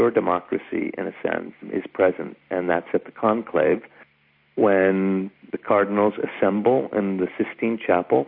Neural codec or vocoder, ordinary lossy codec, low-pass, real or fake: none; MP3, 32 kbps; 5.4 kHz; real